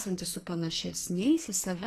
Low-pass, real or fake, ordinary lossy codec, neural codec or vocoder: 14.4 kHz; fake; AAC, 48 kbps; codec, 44.1 kHz, 2.6 kbps, SNAC